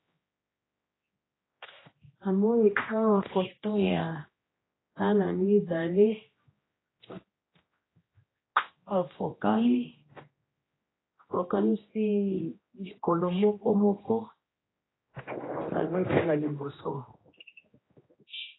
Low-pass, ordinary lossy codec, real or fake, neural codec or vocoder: 7.2 kHz; AAC, 16 kbps; fake; codec, 16 kHz, 1 kbps, X-Codec, HuBERT features, trained on general audio